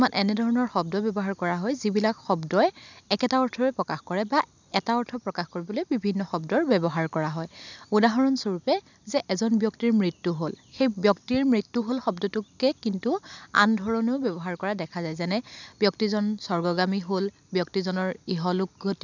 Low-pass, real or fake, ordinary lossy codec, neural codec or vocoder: 7.2 kHz; real; none; none